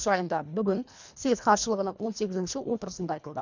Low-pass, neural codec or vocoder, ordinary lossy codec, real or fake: 7.2 kHz; codec, 24 kHz, 1.5 kbps, HILCodec; none; fake